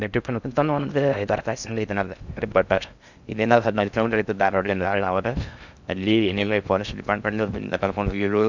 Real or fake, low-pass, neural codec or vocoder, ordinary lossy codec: fake; 7.2 kHz; codec, 16 kHz in and 24 kHz out, 0.6 kbps, FocalCodec, streaming, 2048 codes; none